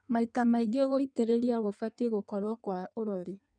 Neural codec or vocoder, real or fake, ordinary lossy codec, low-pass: codec, 16 kHz in and 24 kHz out, 1.1 kbps, FireRedTTS-2 codec; fake; none; 9.9 kHz